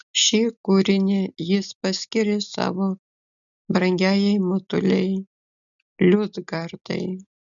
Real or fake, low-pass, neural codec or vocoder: real; 7.2 kHz; none